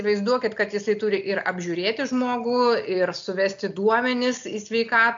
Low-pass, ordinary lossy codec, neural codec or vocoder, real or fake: 7.2 kHz; AAC, 64 kbps; none; real